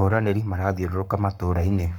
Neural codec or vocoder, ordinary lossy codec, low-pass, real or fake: codec, 44.1 kHz, 7.8 kbps, Pupu-Codec; none; 19.8 kHz; fake